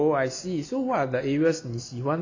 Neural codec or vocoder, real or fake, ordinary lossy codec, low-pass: none; real; AAC, 48 kbps; 7.2 kHz